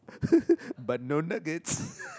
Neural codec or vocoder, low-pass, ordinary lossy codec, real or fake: none; none; none; real